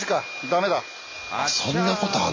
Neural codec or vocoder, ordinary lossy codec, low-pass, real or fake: none; AAC, 32 kbps; 7.2 kHz; real